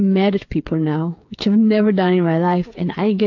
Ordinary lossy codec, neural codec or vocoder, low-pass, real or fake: AAC, 48 kbps; codec, 16 kHz, 8 kbps, FreqCodec, smaller model; 7.2 kHz; fake